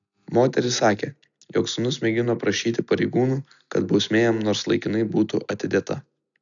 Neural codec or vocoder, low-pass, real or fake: none; 7.2 kHz; real